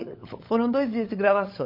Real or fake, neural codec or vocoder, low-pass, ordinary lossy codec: fake; codec, 16 kHz, 16 kbps, FunCodec, trained on LibriTTS, 50 frames a second; 5.4 kHz; MP3, 32 kbps